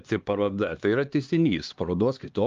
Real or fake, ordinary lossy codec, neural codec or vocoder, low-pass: fake; Opus, 32 kbps; codec, 16 kHz, 2 kbps, X-Codec, HuBERT features, trained on LibriSpeech; 7.2 kHz